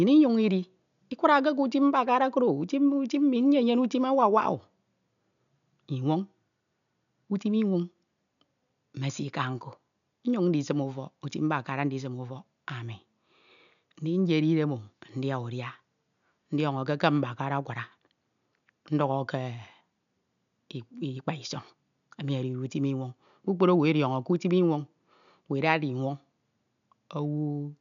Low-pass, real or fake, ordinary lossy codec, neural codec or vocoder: 7.2 kHz; real; none; none